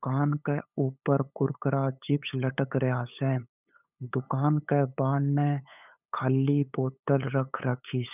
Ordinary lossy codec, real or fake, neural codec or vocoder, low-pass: none; fake; codec, 16 kHz, 8 kbps, FunCodec, trained on LibriTTS, 25 frames a second; 3.6 kHz